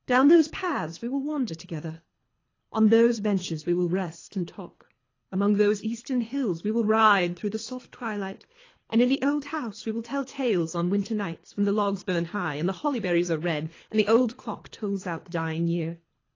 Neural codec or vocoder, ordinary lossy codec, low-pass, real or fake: codec, 24 kHz, 3 kbps, HILCodec; AAC, 32 kbps; 7.2 kHz; fake